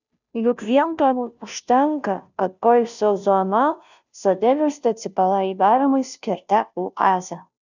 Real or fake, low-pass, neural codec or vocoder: fake; 7.2 kHz; codec, 16 kHz, 0.5 kbps, FunCodec, trained on Chinese and English, 25 frames a second